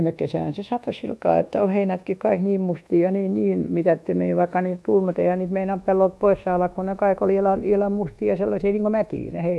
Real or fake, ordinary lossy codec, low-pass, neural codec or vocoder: fake; none; none; codec, 24 kHz, 1.2 kbps, DualCodec